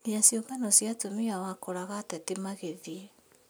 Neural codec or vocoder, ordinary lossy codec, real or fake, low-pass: none; none; real; none